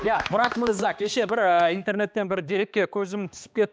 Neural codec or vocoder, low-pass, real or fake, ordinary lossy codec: codec, 16 kHz, 2 kbps, X-Codec, HuBERT features, trained on balanced general audio; none; fake; none